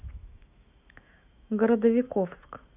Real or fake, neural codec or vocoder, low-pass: fake; autoencoder, 48 kHz, 128 numbers a frame, DAC-VAE, trained on Japanese speech; 3.6 kHz